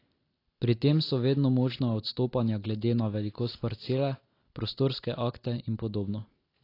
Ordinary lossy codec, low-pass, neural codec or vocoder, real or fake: AAC, 32 kbps; 5.4 kHz; none; real